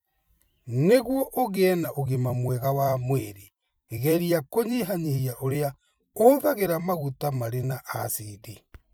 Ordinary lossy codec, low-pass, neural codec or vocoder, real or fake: none; none; vocoder, 44.1 kHz, 128 mel bands every 256 samples, BigVGAN v2; fake